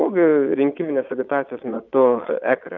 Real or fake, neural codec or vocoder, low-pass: fake; vocoder, 22.05 kHz, 80 mel bands, Vocos; 7.2 kHz